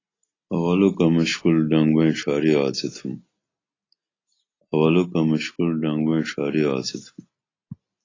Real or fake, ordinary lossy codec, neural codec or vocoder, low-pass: real; AAC, 32 kbps; none; 7.2 kHz